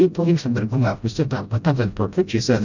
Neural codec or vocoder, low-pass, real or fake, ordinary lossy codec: codec, 16 kHz, 0.5 kbps, FreqCodec, smaller model; 7.2 kHz; fake; none